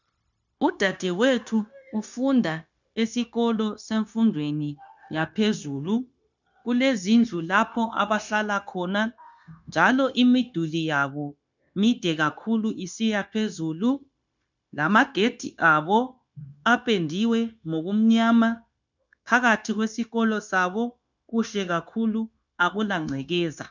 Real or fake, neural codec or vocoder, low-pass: fake; codec, 16 kHz, 0.9 kbps, LongCat-Audio-Codec; 7.2 kHz